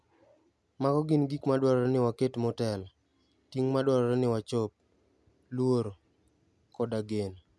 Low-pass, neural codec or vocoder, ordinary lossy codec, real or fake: none; none; none; real